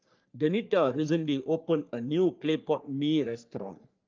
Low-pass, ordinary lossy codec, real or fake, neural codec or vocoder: 7.2 kHz; Opus, 24 kbps; fake; codec, 44.1 kHz, 3.4 kbps, Pupu-Codec